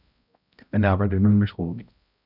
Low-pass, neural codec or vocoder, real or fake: 5.4 kHz; codec, 16 kHz, 0.5 kbps, X-Codec, HuBERT features, trained on balanced general audio; fake